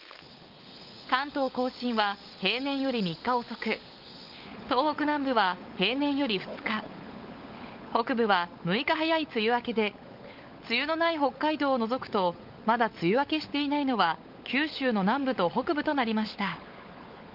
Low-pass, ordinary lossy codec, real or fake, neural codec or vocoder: 5.4 kHz; Opus, 32 kbps; fake; codec, 16 kHz, 16 kbps, FunCodec, trained on LibriTTS, 50 frames a second